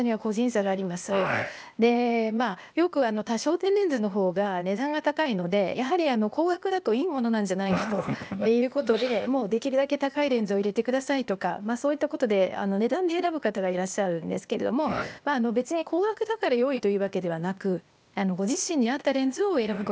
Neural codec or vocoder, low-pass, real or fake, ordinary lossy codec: codec, 16 kHz, 0.8 kbps, ZipCodec; none; fake; none